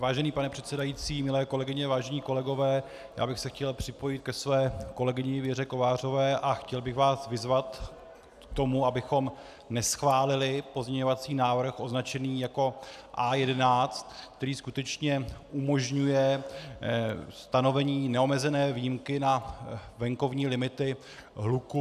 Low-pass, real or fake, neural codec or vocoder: 14.4 kHz; real; none